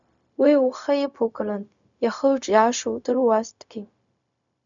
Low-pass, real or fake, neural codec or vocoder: 7.2 kHz; fake; codec, 16 kHz, 0.4 kbps, LongCat-Audio-Codec